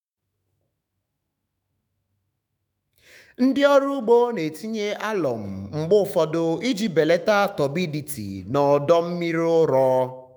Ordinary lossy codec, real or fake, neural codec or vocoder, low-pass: none; fake; autoencoder, 48 kHz, 128 numbers a frame, DAC-VAE, trained on Japanese speech; 19.8 kHz